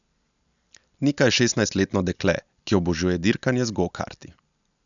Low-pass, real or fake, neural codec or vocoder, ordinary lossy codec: 7.2 kHz; real; none; none